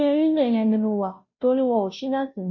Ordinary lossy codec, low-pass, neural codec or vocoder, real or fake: MP3, 32 kbps; 7.2 kHz; codec, 16 kHz, 0.5 kbps, FunCodec, trained on Chinese and English, 25 frames a second; fake